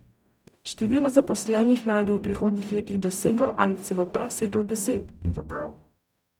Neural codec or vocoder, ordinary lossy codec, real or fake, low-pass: codec, 44.1 kHz, 0.9 kbps, DAC; none; fake; 19.8 kHz